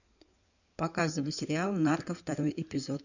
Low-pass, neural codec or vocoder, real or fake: 7.2 kHz; codec, 16 kHz in and 24 kHz out, 2.2 kbps, FireRedTTS-2 codec; fake